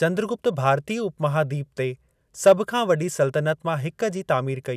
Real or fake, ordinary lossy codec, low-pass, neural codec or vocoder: real; none; 14.4 kHz; none